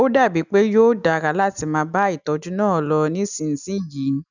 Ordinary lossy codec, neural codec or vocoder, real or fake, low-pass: none; none; real; 7.2 kHz